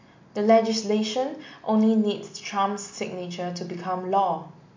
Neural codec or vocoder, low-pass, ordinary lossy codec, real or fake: none; 7.2 kHz; MP3, 48 kbps; real